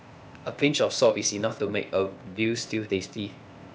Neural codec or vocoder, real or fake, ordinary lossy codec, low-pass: codec, 16 kHz, 0.8 kbps, ZipCodec; fake; none; none